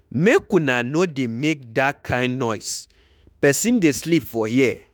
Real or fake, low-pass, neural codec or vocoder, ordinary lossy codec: fake; none; autoencoder, 48 kHz, 32 numbers a frame, DAC-VAE, trained on Japanese speech; none